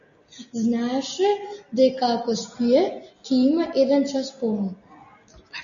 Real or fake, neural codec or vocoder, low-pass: real; none; 7.2 kHz